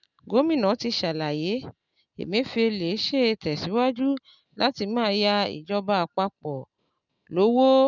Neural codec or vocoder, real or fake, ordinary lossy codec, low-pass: none; real; none; 7.2 kHz